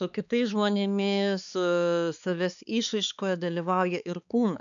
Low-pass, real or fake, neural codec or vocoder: 7.2 kHz; fake; codec, 16 kHz, 4 kbps, X-Codec, HuBERT features, trained on balanced general audio